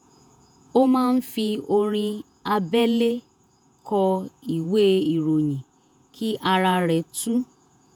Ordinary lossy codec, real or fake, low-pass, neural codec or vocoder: none; fake; none; vocoder, 48 kHz, 128 mel bands, Vocos